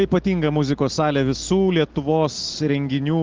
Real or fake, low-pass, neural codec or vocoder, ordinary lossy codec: real; 7.2 kHz; none; Opus, 16 kbps